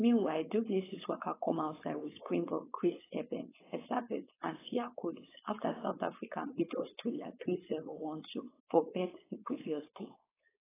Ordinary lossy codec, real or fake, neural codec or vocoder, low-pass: AAC, 16 kbps; fake; codec, 16 kHz, 4.8 kbps, FACodec; 3.6 kHz